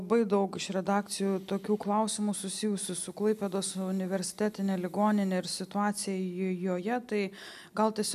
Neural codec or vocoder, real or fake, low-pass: none; real; 14.4 kHz